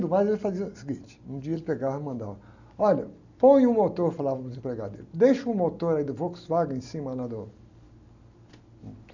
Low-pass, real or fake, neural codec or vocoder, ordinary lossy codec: 7.2 kHz; real; none; none